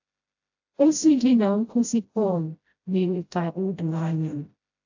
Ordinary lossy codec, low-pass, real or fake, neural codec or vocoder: AAC, 48 kbps; 7.2 kHz; fake; codec, 16 kHz, 0.5 kbps, FreqCodec, smaller model